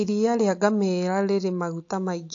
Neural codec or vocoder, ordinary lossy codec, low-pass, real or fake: none; AAC, 48 kbps; 7.2 kHz; real